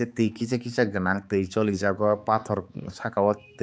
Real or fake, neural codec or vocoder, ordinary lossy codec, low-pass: fake; codec, 16 kHz, 4 kbps, X-Codec, HuBERT features, trained on balanced general audio; none; none